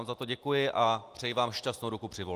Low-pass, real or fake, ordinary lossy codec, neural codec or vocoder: 14.4 kHz; real; Opus, 32 kbps; none